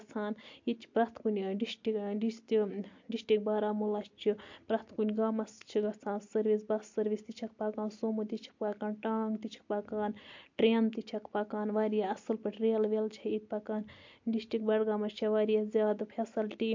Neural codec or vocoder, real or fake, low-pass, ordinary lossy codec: none; real; 7.2 kHz; MP3, 48 kbps